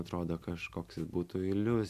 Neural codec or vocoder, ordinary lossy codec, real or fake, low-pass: none; MP3, 96 kbps; real; 14.4 kHz